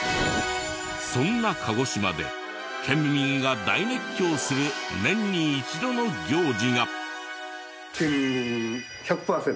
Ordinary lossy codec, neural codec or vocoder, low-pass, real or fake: none; none; none; real